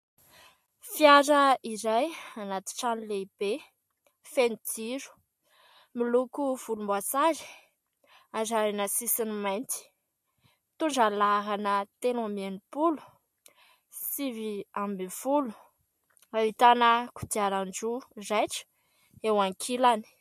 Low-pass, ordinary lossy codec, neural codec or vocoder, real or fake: 14.4 kHz; MP3, 64 kbps; none; real